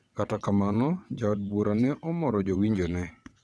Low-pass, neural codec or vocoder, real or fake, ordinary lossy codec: none; vocoder, 22.05 kHz, 80 mel bands, WaveNeXt; fake; none